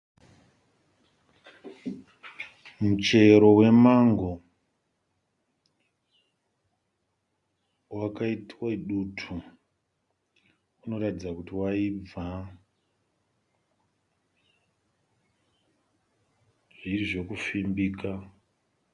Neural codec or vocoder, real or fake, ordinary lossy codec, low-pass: none; real; Opus, 64 kbps; 10.8 kHz